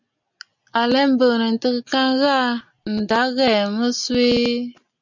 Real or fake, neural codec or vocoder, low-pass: real; none; 7.2 kHz